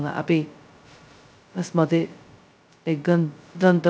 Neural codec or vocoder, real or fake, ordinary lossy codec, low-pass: codec, 16 kHz, 0.2 kbps, FocalCodec; fake; none; none